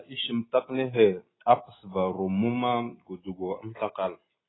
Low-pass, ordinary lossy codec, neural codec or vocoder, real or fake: 7.2 kHz; AAC, 16 kbps; none; real